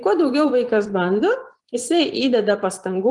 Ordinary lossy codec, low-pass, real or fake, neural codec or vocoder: Opus, 24 kbps; 10.8 kHz; fake; vocoder, 24 kHz, 100 mel bands, Vocos